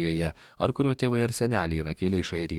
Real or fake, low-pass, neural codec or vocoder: fake; 19.8 kHz; codec, 44.1 kHz, 2.6 kbps, DAC